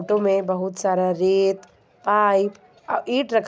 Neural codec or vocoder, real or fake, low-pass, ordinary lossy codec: none; real; none; none